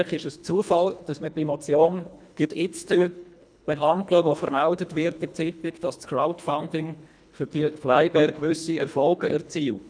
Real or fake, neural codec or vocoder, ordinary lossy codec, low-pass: fake; codec, 24 kHz, 1.5 kbps, HILCodec; none; 9.9 kHz